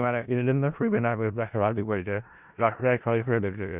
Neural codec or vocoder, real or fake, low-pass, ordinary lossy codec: codec, 16 kHz in and 24 kHz out, 0.4 kbps, LongCat-Audio-Codec, four codebook decoder; fake; 3.6 kHz; Opus, 64 kbps